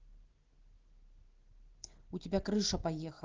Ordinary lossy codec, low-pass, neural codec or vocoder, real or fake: Opus, 16 kbps; 7.2 kHz; none; real